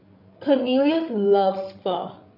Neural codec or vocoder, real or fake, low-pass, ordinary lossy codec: codec, 16 kHz, 8 kbps, FreqCodec, larger model; fake; 5.4 kHz; none